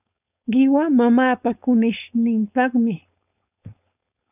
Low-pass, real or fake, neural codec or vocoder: 3.6 kHz; fake; codec, 16 kHz, 4.8 kbps, FACodec